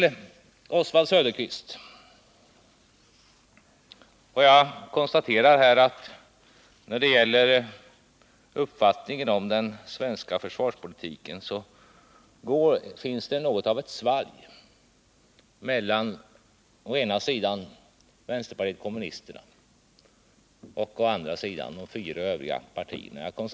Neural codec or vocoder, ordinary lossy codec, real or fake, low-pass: none; none; real; none